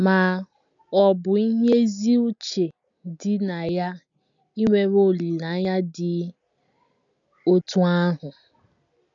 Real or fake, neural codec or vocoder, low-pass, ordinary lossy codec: real; none; 7.2 kHz; none